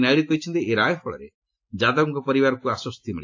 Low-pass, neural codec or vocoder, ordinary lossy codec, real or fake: 7.2 kHz; none; AAC, 48 kbps; real